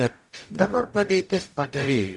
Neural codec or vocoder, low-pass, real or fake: codec, 44.1 kHz, 0.9 kbps, DAC; 10.8 kHz; fake